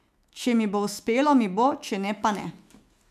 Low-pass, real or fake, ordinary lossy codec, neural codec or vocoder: 14.4 kHz; fake; MP3, 96 kbps; autoencoder, 48 kHz, 128 numbers a frame, DAC-VAE, trained on Japanese speech